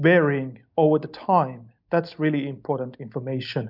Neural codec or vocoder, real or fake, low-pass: none; real; 5.4 kHz